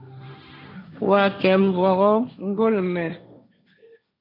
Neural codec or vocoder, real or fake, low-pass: codec, 16 kHz, 1.1 kbps, Voila-Tokenizer; fake; 5.4 kHz